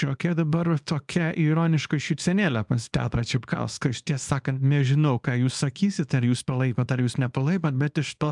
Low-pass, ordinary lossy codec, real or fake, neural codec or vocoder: 10.8 kHz; MP3, 96 kbps; fake; codec, 24 kHz, 0.9 kbps, WavTokenizer, small release